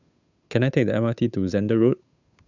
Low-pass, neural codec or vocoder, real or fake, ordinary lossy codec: 7.2 kHz; codec, 16 kHz, 8 kbps, FunCodec, trained on Chinese and English, 25 frames a second; fake; none